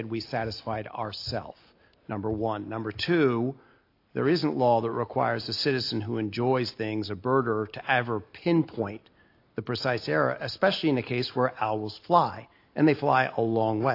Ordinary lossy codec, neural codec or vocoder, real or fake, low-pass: AAC, 32 kbps; none; real; 5.4 kHz